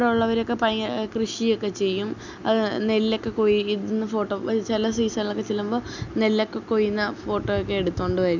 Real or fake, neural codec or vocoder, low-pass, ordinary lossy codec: real; none; 7.2 kHz; none